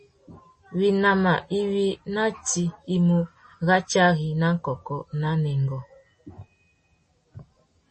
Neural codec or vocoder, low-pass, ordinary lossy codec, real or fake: none; 10.8 kHz; MP3, 32 kbps; real